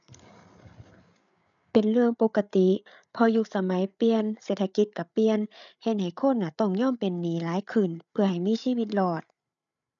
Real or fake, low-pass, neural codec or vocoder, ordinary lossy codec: fake; 7.2 kHz; codec, 16 kHz, 8 kbps, FreqCodec, larger model; none